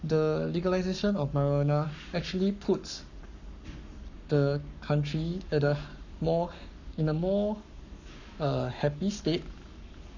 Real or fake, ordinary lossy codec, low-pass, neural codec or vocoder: fake; none; 7.2 kHz; codec, 44.1 kHz, 7.8 kbps, Pupu-Codec